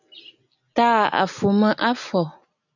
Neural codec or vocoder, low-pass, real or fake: none; 7.2 kHz; real